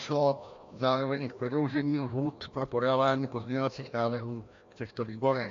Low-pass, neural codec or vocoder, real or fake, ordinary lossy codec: 7.2 kHz; codec, 16 kHz, 1 kbps, FreqCodec, larger model; fake; AAC, 64 kbps